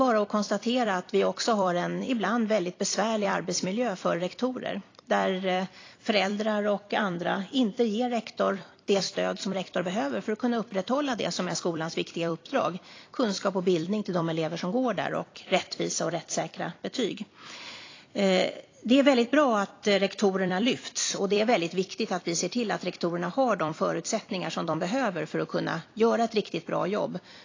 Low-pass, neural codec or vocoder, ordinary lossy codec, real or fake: 7.2 kHz; vocoder, 44.1 kHz, 128 mel bands every 256 samples, BigVGAN v2; AAC, 32 kbps; fake